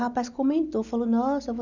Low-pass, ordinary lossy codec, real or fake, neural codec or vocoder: 7.2 kHz; none; real; none